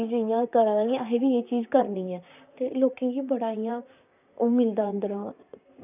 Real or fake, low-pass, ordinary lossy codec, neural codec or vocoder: fake; 3.6 kHz; none; vocoder, 44.1 kHz, 128 mel bands, Pupu-Vocoder